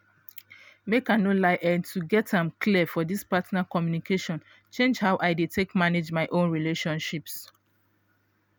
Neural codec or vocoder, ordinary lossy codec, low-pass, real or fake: none; none; none; real